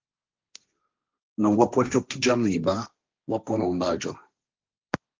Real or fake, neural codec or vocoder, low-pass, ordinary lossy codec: fake; codec, 16 kHz, 1.1 kbps, Voila-Tokenizer; 7.2 kHz; Opus, 32 kbps